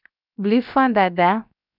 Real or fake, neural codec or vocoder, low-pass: fake; codec, 16 kHz, 0.7 kbps, FocalCodec; 5.4 kHz